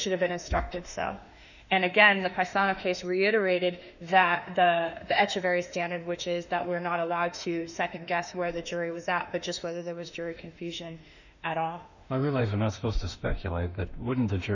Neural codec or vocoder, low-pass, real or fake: autoencoder, 48 kHz, 32 numbers a frame, DAC-VAE, trained on Japanese speech; 7.2 kHz; fake